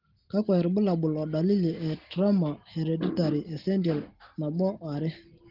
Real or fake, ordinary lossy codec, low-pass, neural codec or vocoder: real; Opus, 16 kbps; 5.4 kHz; none